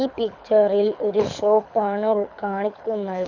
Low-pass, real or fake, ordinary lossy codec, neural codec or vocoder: 7.2 kHz; fake; none; codec, 24 kHz, 6 kbps, HILCodec